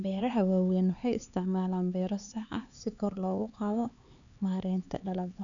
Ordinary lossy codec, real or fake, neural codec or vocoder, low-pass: none; fake; codec, 16 kHz, 4 kbps, X-Codec, HuBERT features, trained on LibriSpeech; 7.2 kHz